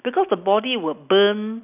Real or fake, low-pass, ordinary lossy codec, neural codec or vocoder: real; 3.6 kHz; none; none